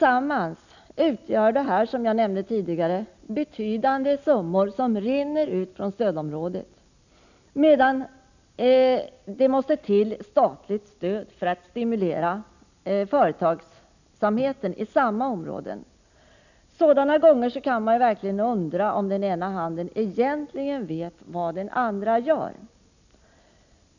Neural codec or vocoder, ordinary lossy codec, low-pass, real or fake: none; none; 7.2 kHz; real